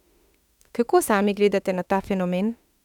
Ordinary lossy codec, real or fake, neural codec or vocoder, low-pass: none; fake; autoencoder, 48 kHz, 32 numbers a frame, DAC-VAE, trained on Japanese speech; 19.8 kHz